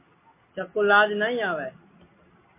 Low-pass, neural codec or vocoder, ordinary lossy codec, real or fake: 3.6 kHz; none; MP3, 24 kbps; real